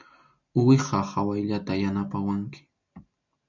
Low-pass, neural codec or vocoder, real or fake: 7.2 kHz; none; real